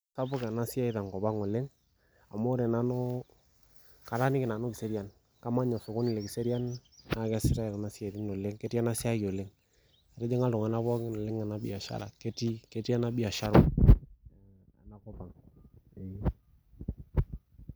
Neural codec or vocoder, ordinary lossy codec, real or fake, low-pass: none; none; real; none